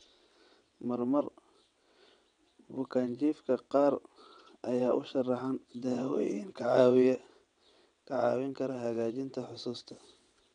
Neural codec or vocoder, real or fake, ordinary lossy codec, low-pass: vocoder, 22.05 kHz, 80 mel bands, WaveNeXt; fake; none; 9.9 kHz